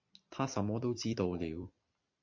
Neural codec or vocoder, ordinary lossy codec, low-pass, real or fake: vocoder, 44.1 kHz, 128 mel bands every 256 samples, BigVGAN v2; MP3, 48 kbps; 7.2 kHz; fake